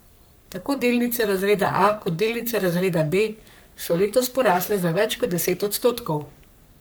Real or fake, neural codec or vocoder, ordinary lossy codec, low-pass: fake; codec, 44.1 kHz, 3.4 kbps, Pupu-Codec; none; none